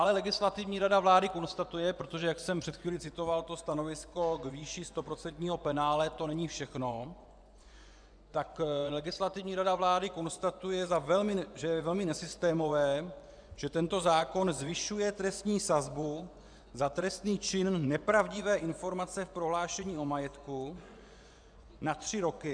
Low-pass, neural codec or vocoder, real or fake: 9.9 kHz; vocoder, 24 kHz, 100 mel bands, Vocos; fake